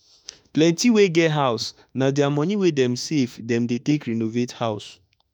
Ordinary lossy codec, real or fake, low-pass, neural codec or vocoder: none; fake; 19.8 kHz; autoencoder, 48 kHz, 32 numbers a frame, DAC-VAE, trained on Japanese speech